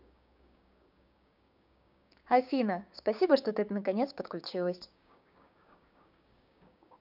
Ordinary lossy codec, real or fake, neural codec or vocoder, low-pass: none; fake; codec, 16 kHz, 6 kbps, DAC; 5.4 kHz